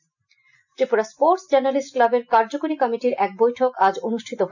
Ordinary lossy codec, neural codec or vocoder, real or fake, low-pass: none; none; real; 7.2 kHz